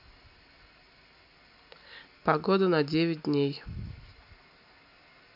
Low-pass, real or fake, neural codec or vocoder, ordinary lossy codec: 5.4 kHz; real; none; none